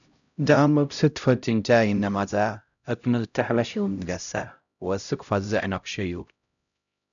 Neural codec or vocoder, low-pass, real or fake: codec, 16 kHz, 0.5 kbps, X-Codec, HuBERT features, trained on LibriSpeech; 7.2 kHz; fake